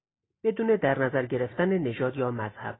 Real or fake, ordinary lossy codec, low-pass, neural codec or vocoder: real; AAC, 16 kbps; 7.2 kHz; none